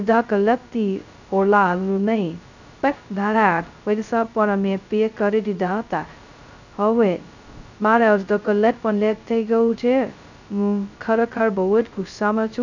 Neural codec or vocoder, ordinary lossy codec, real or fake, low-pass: codec, 16 kHz, 0.2 kbps, FocalCodec; none; fake; 7.2 kHz